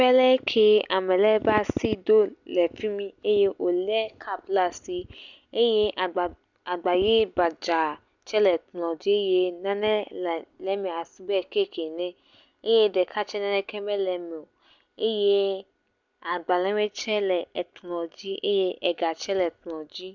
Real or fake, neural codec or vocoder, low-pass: real; none; 7.2 kHz